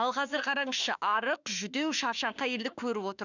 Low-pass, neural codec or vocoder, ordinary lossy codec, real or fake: 7.2 kHz; autoencoder, 48 kHz, 32 numbers a frame, DAC-VAE, trained on Japanese speech; none; fake